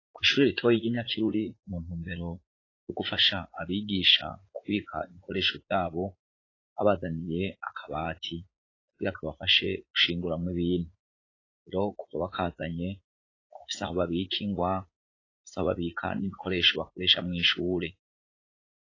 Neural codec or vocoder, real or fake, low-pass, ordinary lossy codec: vocoder, 44.1 kHz, 80 mel bands, Vocos; fake; 7.2 kHz; AAC, 32 kbps